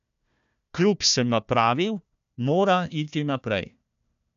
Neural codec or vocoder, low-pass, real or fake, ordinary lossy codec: codec, 16 kHz, 1 kbps, FunCodec, trained on Chinese and English, 50 frames a second; 7.2 kHz; fake; none